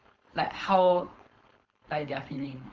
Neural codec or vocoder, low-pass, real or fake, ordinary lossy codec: codec, 16 kHz, 4.8 kbps, FACodec; 7.2 kHz; fake; Opus, 24 kbps